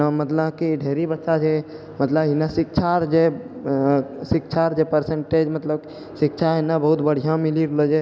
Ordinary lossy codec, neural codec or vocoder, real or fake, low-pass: none; none; real; none